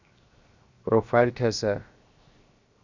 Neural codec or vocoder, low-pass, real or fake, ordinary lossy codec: codec, 16 kHz, 0.7 kbps, FocalCodec; 7.2 kHz; fake; Opus, 64 kbps